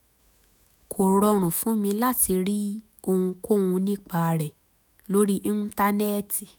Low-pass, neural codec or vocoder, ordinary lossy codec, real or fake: none; autoencoder, 48 kHz, 128 numbers a frame, DAC-VAE, trained on Japanese speech; none; fake